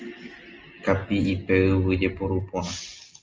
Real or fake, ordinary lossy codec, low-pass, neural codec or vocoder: real; Opus, 24 kbps; 7.2 kHz; none